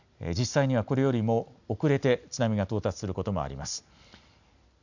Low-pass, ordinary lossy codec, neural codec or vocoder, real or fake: 7.2 kHz; none; none; real